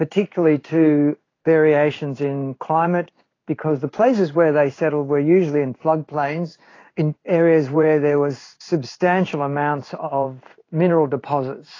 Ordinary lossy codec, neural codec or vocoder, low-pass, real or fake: AAC, 32 kbps; codec, 16 kHz in and 24 kHz out, 1 kbps, XY-Tokenizer; 7.2 kHz; fake